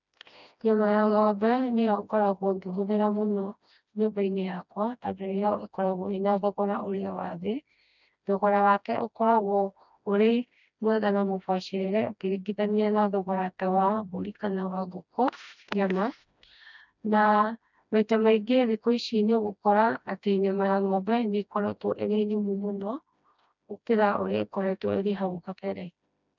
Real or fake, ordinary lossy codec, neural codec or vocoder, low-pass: fake; none; codec, 16 kHz, 1 kbps, FreqCodec, smaller model; 7.2 kHz